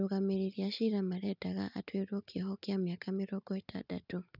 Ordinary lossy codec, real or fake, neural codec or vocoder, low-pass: none; real; none; 5.4 kHz